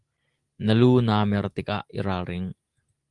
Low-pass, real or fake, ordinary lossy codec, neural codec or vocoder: 10.8 kHz; real; Opus, 24 kbps; none